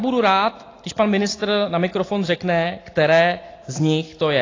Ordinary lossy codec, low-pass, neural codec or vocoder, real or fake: AAC, 32 kbps; 7.2 kHz; none; real